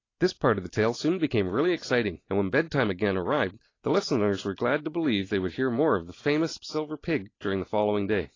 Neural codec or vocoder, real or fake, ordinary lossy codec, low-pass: none; real; AAC, 32 kbps; 7.2 kHz